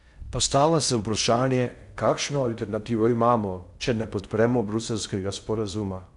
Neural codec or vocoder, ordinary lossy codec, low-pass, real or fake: codec, 16 kHz in and 24 kHz out, 0.6 kbps, FocalCodec, streaming, 4096 codes; none; 10.8 kHz; fake